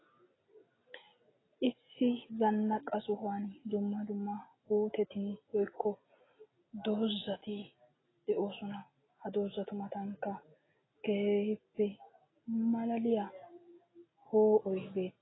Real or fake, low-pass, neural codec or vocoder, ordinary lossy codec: real; 7.2 kHz; none; AAC, 16 kbps